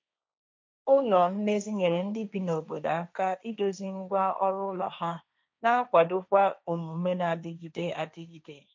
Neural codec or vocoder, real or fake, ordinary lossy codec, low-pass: codec, 16 kHz, 1.1 kbps, Voila-Tokenizer; fake; none; none